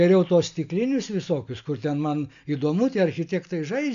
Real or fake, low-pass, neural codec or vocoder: real; 7.2 kHz; none